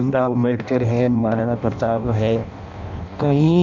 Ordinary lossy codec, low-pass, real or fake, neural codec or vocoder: none; 7.2 kHz; fake; codec, 16 kHz in and 24 kHz out, 0.6 kbps, FireRedTTS-2 codec